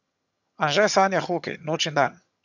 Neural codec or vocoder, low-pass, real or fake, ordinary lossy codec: vocoder, 22.05 kHz, 80 mel bands, HiFi-GAN; 7.2 kHz; fake; none